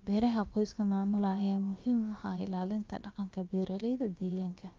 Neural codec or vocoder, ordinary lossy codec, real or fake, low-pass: codec, 16 kHz, about 1 kbps, DyCAST, with the encoder's durations; Opus, 32 kbps; fake; 7.2 kHz